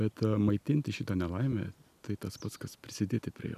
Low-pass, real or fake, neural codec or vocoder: 14.4 kHz; real; none